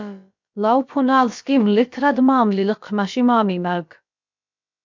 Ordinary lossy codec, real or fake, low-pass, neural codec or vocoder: MP3, 64 kbps; fake; 7.2 kHz; codec, 16 kHz, about 1 kbps, DyCAST, with the encoder's durations